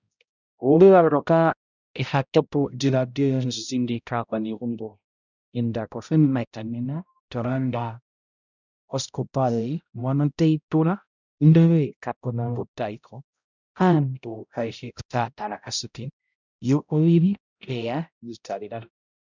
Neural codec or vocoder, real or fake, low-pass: codec, 16 kHz, 0.5 kbps, X-Codec, HuBERT features, trained on balanced general audio; fake; 7.2 kHz